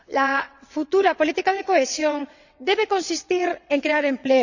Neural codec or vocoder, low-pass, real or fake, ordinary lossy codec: vocoder, 22.05 kHz, 80 mel bands, WaveNeXt; 7.2 kHz; fake; none